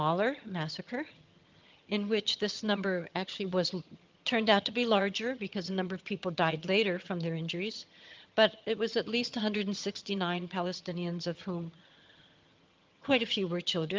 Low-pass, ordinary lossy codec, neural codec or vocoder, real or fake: 7.2 kHz; Opus, 32 kbps; vocoder, 22.05 kHz, 80 mel bands, HiFi-GAN; fake